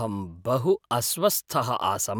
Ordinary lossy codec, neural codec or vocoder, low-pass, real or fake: none; none; none; real